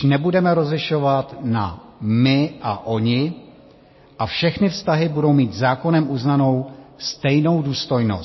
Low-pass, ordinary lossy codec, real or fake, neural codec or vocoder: 7.2 kHz; MP3, 24 kbps; real; none